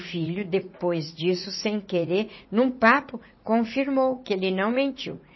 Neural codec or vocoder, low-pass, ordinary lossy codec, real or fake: vocoder, 44.1 kHz, 80 mel bands, Vocos; 7.2 kHz; MP3, 24 kbps; fake